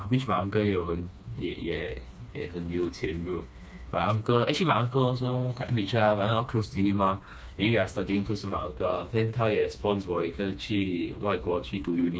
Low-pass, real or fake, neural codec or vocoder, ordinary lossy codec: none; fake; codec, 16 kHz, 2 kbps, FreqCodec, smaller model; none